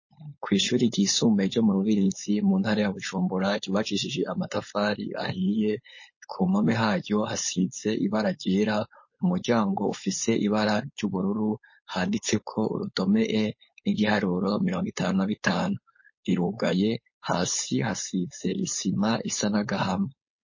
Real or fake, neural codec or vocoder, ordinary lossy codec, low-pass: fake; codec, 16 kHz, 4.8 kbps, FACodec; MP3, 32 kbps; 7.2 kHz